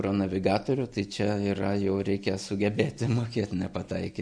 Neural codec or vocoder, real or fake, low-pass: none; real; 9.9 kHz